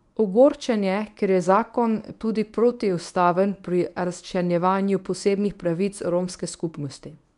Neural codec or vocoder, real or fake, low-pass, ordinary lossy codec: codec, 24 kHz, 0.9 kbps, WavTokenizer, medium speech release version 1; fake; 10.8 kHz; none